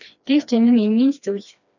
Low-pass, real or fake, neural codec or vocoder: 7.2 kHz; fake; codec, 16 kHz, 2 kbps, FreqCodec, smaller model